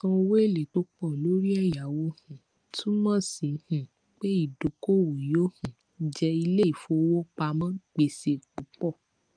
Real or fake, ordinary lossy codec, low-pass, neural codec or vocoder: real; Opus, 32 kbps; 9.9 kHz; none